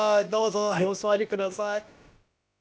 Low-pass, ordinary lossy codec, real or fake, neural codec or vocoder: none; none; fake; codec, 16 kHz, about 1 kbps, DyCAST, with the encoder's durations